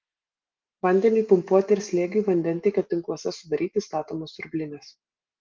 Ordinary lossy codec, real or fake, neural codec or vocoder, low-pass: Opus, 24 kbps; real; none; 7.2 kHz